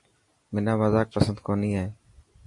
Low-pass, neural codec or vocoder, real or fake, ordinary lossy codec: 10.8 kHz; none; real; MP3, 48 kbps